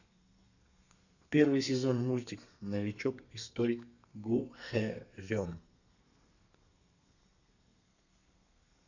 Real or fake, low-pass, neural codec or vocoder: fake; 7.2 kHz; codec, 32 kHz, 1.9 kbps, SNAC